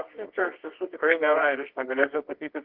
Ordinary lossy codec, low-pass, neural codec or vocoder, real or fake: Opus, 16 kbps; 5.4 kHz; codec, 24 kHz, 0.9 kbps, WavTokenizer, medium music audio release; fake